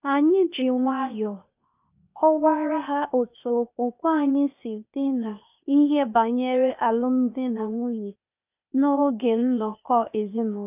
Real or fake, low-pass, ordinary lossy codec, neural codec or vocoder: fake; 3.6 kHz; none; codec, 16 kHz, 0.8 kbps, ZipCodec